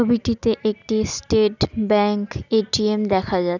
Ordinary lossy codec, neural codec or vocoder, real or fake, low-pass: none; none; real; 7.2 kHz